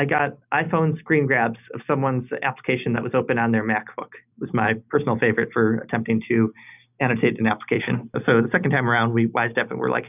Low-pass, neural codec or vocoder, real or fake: 3.6 kHz; none; real